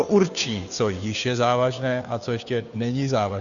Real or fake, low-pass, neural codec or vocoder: fake; 7.2 kHz; codec, 16 kHz, 2 kbps, FunCodec, trained on Chinese and English, 25 frames a second